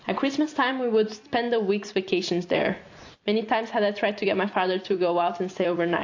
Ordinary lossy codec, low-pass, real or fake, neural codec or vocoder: AAC, 48 kbps; 7.2 kHz; fake; vocoder, 44.1 kHz, 128 mel bands every 256 samples, BigVGAN v2